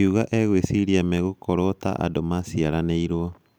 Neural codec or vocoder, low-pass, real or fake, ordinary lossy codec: none; none; real; none